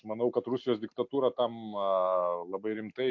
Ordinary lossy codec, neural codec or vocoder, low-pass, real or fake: MP3, 48 kbps; none; 7.2 kHz; real